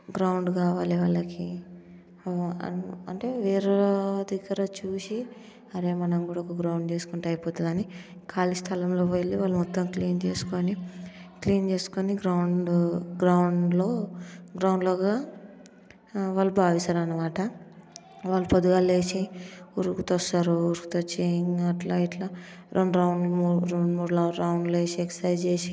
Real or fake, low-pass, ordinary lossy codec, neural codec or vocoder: real; none; none; none